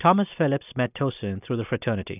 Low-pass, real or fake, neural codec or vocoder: 3.6 kHz; real; none